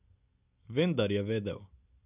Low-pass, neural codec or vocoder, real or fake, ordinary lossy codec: 3.6 kHz; none; real; none